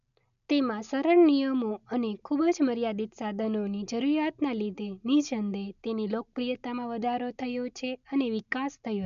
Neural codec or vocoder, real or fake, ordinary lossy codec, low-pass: none; real; none; 7.2 kHz